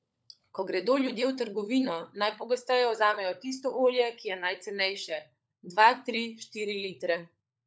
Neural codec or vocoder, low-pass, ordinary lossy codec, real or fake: codec, 16 kHz, 16 kbps, FunCodec, trained on LibriTTS, 50 frames a second; none; none; fake